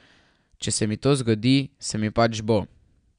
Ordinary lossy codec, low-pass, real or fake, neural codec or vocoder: none; 9.9 kHz; real; none